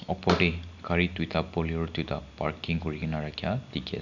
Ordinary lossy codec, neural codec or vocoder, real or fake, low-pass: none; none; real; 7.2 kHz